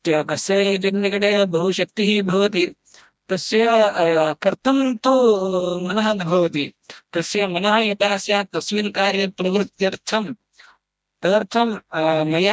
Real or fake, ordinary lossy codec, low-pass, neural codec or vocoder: fake; none; none; codec, 16 kHz, 1 kbps, FreqCodec, smaller model